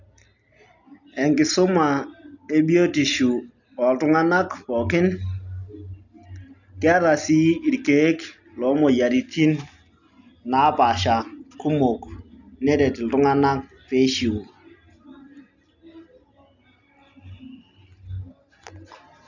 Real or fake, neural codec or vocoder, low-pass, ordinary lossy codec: real; none; 7.2 kHz; none